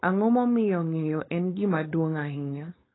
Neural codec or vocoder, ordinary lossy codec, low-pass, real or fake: codec, 16 kHz, 4.8 kbps, FACodec; AAC, 16 kbps; 7.2 kHz; fake